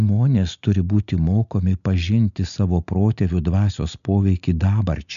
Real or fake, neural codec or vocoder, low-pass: real; none; 7.2 kHz